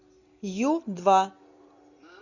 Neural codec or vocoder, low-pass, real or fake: none; 7.2 kHz; real